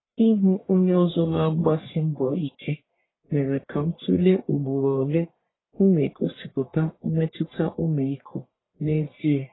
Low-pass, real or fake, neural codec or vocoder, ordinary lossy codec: 7.2 kHz; fake; codec, 44.1 kHz, 1.7 kbps, Pupu-Codec; AAC, 16 kbps